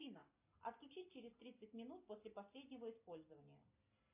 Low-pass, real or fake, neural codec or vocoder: 3.6 kHz; real; none